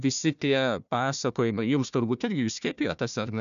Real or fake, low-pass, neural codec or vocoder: fake; 7.2 kHz; codec, 16 kHz, 1 kbps, FunCodec, trained on Chinese and English, 50 frames a second